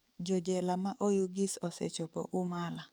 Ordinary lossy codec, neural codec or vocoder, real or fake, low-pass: none; codec, 44.1 kHz, 7.8 kbps, DAC; fake; none